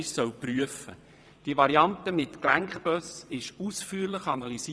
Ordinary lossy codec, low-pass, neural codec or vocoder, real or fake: none; none; vocoder, 22.05 kHz, 80 mel bands, WaveNeXt; fake